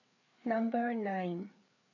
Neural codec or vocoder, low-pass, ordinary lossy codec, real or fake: codec, 16 kHz, 4 kbps, FreqCodec, larger model; 7.2 kHz; none; fake